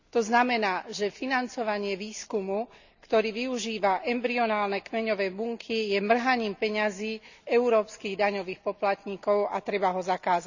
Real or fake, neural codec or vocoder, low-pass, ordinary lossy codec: real; none; 7.2 kHz; none